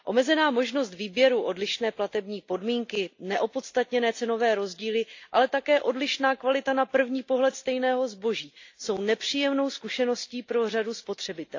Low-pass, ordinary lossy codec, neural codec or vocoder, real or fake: 7.2 kHz; AAC, 48 kbps; none; real